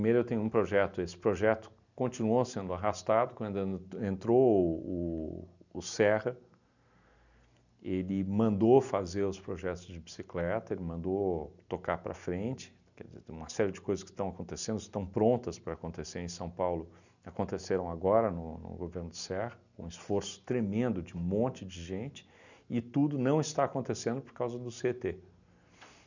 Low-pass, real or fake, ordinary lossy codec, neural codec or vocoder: 7.2 kHz; real; none; none